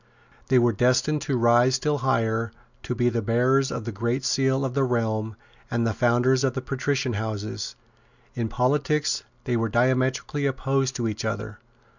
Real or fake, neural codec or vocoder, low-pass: real; none; 7.2 kHz